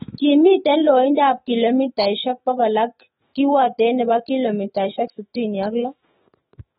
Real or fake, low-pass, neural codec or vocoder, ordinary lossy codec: fake; 19.8 kHz; autoencoder, 48 kHz, 128 numbers a frame, DAC-VAE, trained on Japanese speech; AAC, 16 kbps